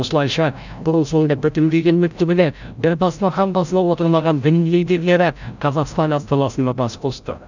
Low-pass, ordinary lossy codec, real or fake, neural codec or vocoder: 7.2 kHz; none; fake; codec, 16 kHz, 0.5 kbps, FreqCodec, larger model